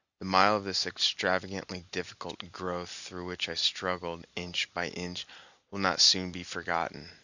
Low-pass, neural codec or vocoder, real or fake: 7.2 kHz; none; real